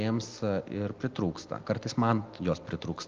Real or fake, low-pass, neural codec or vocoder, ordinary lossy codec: real; 7.2 kHz; none; Opus, 24 kbps